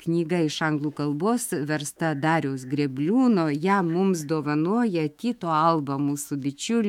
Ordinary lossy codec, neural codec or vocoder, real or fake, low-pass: MP3, 96 kbps; autoencoder, 48 kHz, 128 numbers a frame, DAC-VAE, trained on Japanese speech; fake; 19.8 kHz